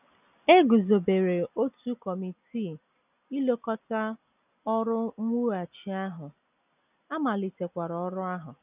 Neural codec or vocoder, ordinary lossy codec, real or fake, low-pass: none; none; real; 3.6 kHz